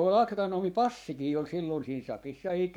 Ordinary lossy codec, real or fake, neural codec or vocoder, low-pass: none; fake; codec, 44.1 kHz, 7.8 kbps, Pupu-Codec; 19.8 kHz